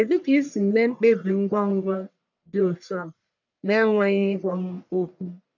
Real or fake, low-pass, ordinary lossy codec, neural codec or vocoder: fake; 7.2 kHz; none; codec, 44.1 kHz, 1.7 kbps, Pupu-Codec